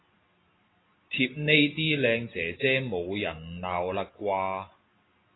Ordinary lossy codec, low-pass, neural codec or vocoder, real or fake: AAC, 16 kbps; 7.2 kHz; none; real